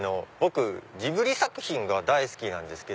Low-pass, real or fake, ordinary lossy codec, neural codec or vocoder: none; real; none; none